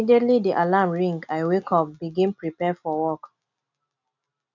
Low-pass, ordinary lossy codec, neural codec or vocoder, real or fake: 7.2 kHz; none; none; real